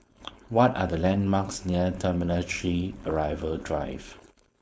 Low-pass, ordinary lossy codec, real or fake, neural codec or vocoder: none; none; fake; codec, 16 kHz, 4.8 kbps, FACodec